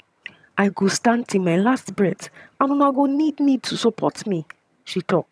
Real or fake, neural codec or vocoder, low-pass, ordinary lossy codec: fake; vocoder, 22.05 kHz, 80 mel bands, HiFi-GAN; none; none